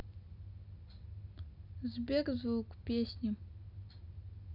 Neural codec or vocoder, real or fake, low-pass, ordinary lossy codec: none; real; 5.4 kHz; none